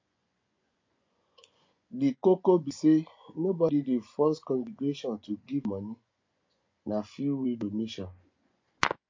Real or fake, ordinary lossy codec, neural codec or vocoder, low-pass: fake; MP3, 48 kbps; vocoder, 24 kHz, 100 mel bands, Vocos; 7.2 kHz